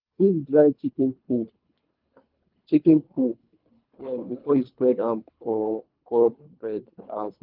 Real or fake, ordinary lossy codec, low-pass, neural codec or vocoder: fake; Opus, 32 kbps; 5.4 kHz; codec, 24 kHz, 3 kbps, HILCodec